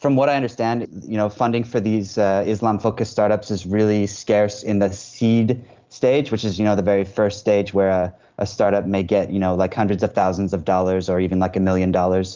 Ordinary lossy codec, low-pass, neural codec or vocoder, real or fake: Opus, 32 kbps; 7.2 kHz; none; real